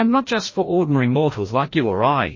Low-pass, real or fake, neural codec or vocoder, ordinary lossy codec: 7.2 kHz; fake; codec, 16 kHz, 1 kbps, FreqCodec, larger model; MP3, 32 kbps